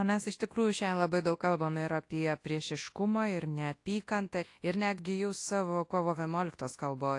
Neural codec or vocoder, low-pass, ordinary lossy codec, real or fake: codec, 24 kHz, 0.9 kbps, WavTokenizer, large speech release; 10.8 kHz; AAC, 48 kbps; fake